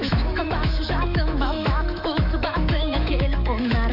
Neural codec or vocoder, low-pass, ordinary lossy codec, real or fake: autoencoder, 48 kHz, 128 numbers a frame, DAC-VAE, trained on Japanese speech; 5.4 kHz; none; fake